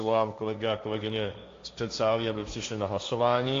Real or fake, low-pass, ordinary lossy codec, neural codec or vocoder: fake; 7.2 kHz; AAC, 48 kbps; codec, 16 kHz, 1.1 kbps, Voila-Tokenizer